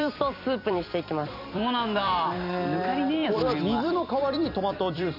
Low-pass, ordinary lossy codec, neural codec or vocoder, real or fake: 5.4 kHz; none; vocoder, 44.1 kHz, 128 mel bands every 256 samples, BigVGAN v2; fake